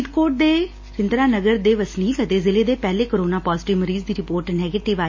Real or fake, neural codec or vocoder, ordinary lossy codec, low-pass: real; none; MP3, 32 kbps; 7.2 kHz